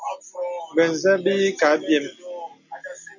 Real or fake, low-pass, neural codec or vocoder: real; 7.2 kHz; none